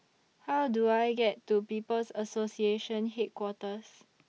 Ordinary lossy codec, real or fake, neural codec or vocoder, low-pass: none; real; none; none